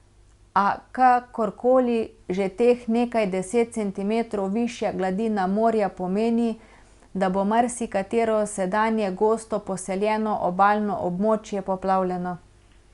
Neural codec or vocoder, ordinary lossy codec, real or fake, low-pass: none; Opus, 64 kbps; real; 10.8 kHz